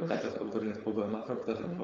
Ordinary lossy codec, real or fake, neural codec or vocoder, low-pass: Opus, 32 kbps; fake; codec, 16 kHz, 4.8 kbps, FACodec; 7.2 kHz